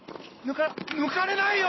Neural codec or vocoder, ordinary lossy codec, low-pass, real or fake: none; MP3, 24 kbps; 7.2 kHz; real